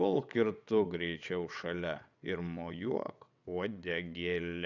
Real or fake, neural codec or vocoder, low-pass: fake; vocoder, 22.05 kHz, 80 mel bands, WaveNeXt; 7.2 kHz